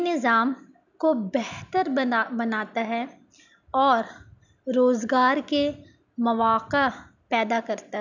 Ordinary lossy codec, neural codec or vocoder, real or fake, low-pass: none; none; real; 7.2 kHz